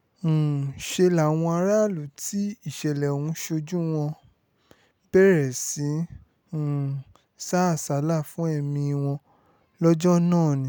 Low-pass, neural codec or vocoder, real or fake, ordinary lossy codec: none; none; real; none